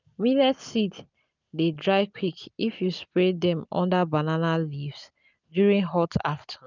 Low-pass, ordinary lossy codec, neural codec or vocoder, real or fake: 7.2 kHz; none; none; real